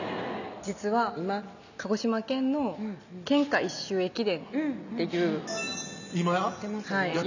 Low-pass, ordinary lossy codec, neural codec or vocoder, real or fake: 7.2 kHz; none; none; real